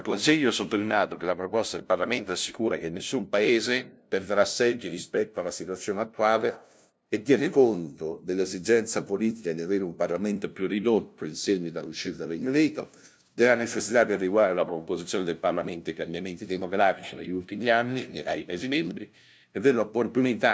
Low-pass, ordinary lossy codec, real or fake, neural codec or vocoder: none; none; fake; codec, 16 kHz, 0.5 kbps, FunCodec, trained on LibriTTS, 25 frames a second